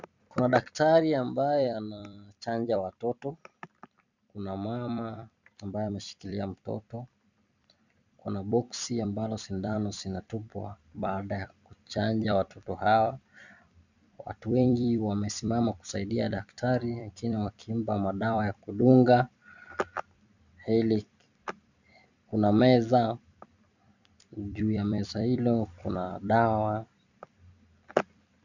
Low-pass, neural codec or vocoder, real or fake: 7.2 kHz; vocoder, 44.1 kHz, 128 mel bands every 256 samples, BigVGAN v2; fake